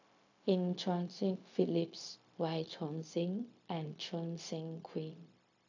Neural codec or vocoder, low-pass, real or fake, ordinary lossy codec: codec, 16 kHz, 0.4 kbps, LongCat-Audio-Codec; 7.2 kHz; fake; AAC, 48 kbps